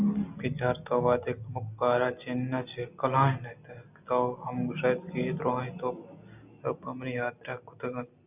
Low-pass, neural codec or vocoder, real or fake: 3.6 kHz; none; real